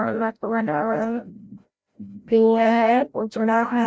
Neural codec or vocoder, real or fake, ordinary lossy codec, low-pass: codec, 16 kHz, 0.5 kbps, FreqCodec, larger model; fake; none; none